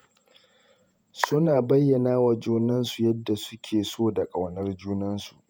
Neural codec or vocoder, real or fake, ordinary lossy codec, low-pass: vocoder, 48 kHz, 128 mel bands, Vocos; fake; none; none